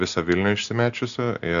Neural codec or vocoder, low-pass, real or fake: none; 7.2 kHz; real